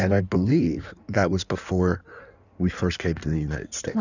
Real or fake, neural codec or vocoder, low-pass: fake; codec, 16 kHz in and 24 kHz out, 1.1 kbps, FireRedTTS-2 codec; 7.2 kHz